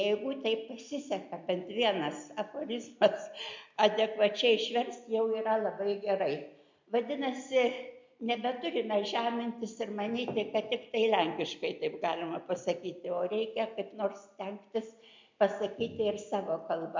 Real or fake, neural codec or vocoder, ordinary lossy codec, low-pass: real; none; MP3, 64 kbps; 7.2 kHz